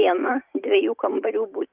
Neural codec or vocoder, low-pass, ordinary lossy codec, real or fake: vocoder, 44.1 kHz, 128 mel bands every 512 samples, BigVGAN v2; 3.6 kHz; Opus, 32 kbps; fake